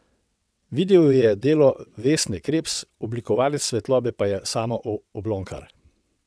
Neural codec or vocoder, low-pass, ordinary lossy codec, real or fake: vocoder, 22.05 kHz, 80 mel bands, WaveNeXt; none; none; fake